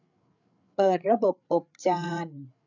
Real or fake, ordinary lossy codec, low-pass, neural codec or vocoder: fake; none; 7.2 kHz; codec, 16 kHz, 8 kbps, FreqCodec, larger model